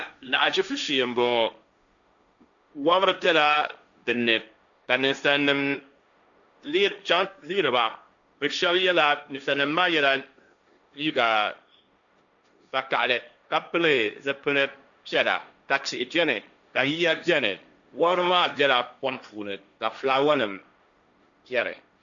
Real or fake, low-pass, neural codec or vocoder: fake; 7.2 kHz; codec, 16 kHz, 1.1 kbps, Voila-Tokenizer